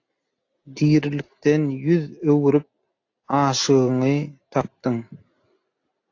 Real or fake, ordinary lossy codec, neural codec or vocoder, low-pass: real; AAC, 48 kbps; none; 7.2 kHz